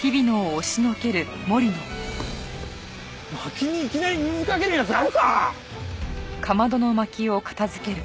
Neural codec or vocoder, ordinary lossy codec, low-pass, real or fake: none; none; none; real